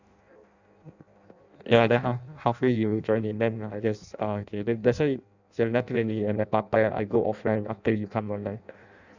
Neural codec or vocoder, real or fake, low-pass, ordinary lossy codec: codec, 16 kHz in and 24 kHz out, 0.6 kbps, FireRedTTS-2 codec; fake; 7.2 kHz; none